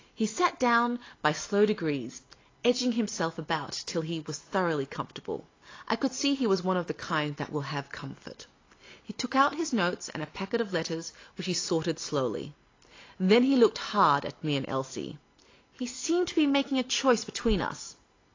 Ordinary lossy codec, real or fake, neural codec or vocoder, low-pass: AAC, 32 kbps; real; none; 7.2 kHz